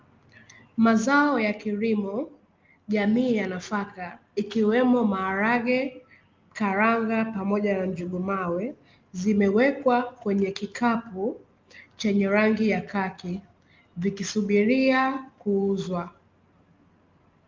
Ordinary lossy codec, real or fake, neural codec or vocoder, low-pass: Opus, 24 kbps; real; none; 7.2 kHz